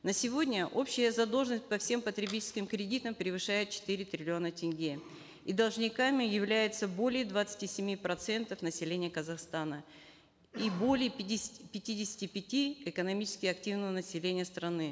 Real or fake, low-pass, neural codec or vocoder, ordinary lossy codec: real; none; none; none